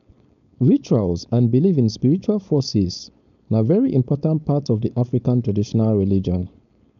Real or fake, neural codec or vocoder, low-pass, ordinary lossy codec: fake; codec, 16 kHz, 4.8 kbps, FACodec; 7.2 kHz; none